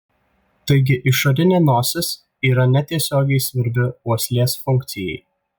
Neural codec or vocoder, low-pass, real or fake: none; 19.8 kHz; real